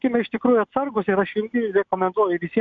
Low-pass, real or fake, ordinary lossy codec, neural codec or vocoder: 7.2 kHz; real; MP3, 64 kbps; none